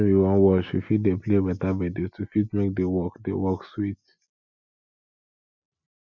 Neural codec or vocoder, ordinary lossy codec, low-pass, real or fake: none; none; 7.2 kHz; real